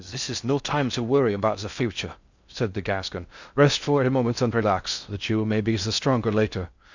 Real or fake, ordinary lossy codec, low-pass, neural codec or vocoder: fake; Opus, 64 kbps; 7.2 kHz; codec, 16 kHz in and 24 kHz out, 0.6 kbps, FocalCodec, streaming, 4096 codes